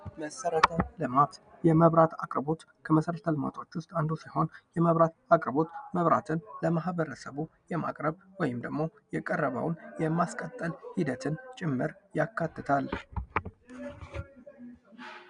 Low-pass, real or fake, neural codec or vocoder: 9.9 kHz; real; none